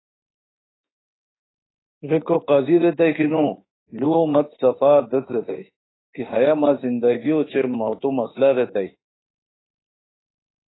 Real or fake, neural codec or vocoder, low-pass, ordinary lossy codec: fake; autoencoder, 48 kHz, 32 numbers a frame, DAC-VAE, trained on Japanese speech; 7.2 kHz; AAC, 16 kbps